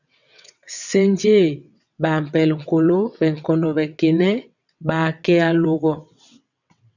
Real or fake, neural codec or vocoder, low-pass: fake; vocoder, 22.05 kHz, 80 mel bands, WaveNeXt; 7.2 kHz